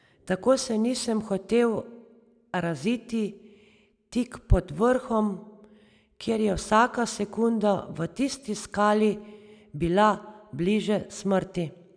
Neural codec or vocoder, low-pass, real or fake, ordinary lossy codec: none; 9.9 kHz; real; none